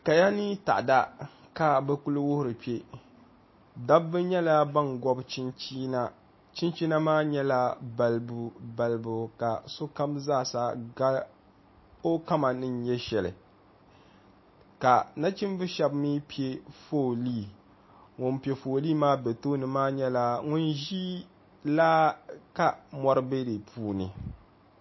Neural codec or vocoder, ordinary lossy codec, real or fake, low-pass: none; MP3, 24 kbps; real; 7.2 kHz